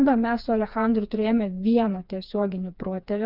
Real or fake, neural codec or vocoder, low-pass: fake; codec, 16 kHz, 4 kbps, FreqCodec, smaller model; 5.4 kHz